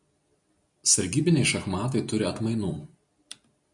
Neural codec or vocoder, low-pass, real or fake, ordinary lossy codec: none; 10.8 kHz; real; MP3, 96 kbps